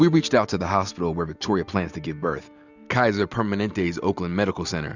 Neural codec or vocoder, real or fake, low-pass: none; real; 7.2 kHz